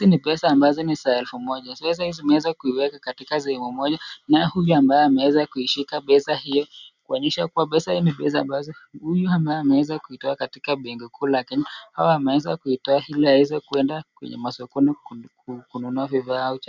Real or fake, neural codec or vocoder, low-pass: real; none; 7.2 kHz